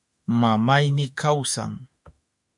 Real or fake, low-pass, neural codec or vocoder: fake; 10.8 kHz; autoencoder, 48 kHz, 32 numbers a frame, DAC-VAE, trained on Japanese speech